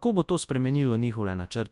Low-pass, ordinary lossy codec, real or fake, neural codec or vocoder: 10.8 kHz; none; fake; codec, 24 kHz, 0.9 kbps, WavTokenizer, large speech release